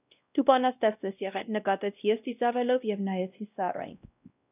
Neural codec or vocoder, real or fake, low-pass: codec, 16 kHz, 1 kbps, X-Codec, WavLM features, trained on Multilingual LibriSpeech; fake; 3.6 kHz